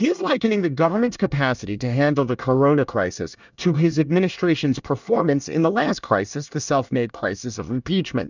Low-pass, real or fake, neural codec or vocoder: 7.2 kHz; fake; codec, 24 kHz, 1 kbps, SNAC